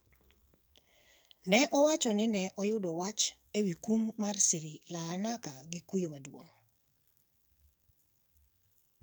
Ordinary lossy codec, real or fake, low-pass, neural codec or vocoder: none; fake; none; codec, 44.1 kHz, 2.6 kbps, SNAC